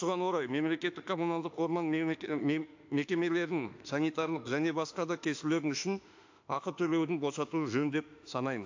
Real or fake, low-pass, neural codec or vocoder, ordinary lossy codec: fake; 7.2 kHz; autoencoder, 48 kHz, 32 numbers a frame, DAC-VAE, trained on Japanese speech; AAC, 48 kbps